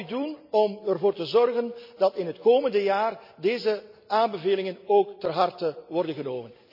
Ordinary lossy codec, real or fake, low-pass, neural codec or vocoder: none; real; 5.4 kHz; none